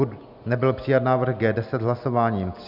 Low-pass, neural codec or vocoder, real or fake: 5.4 kHz; none; real